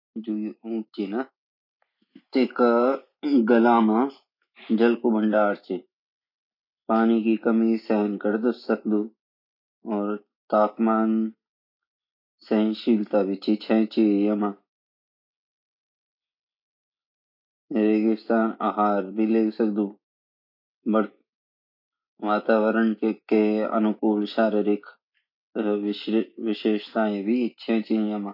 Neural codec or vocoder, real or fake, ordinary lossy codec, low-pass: none; real; none; 5.4 kHz